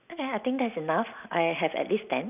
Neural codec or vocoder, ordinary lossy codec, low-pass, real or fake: none; none; 3.6 kHz; real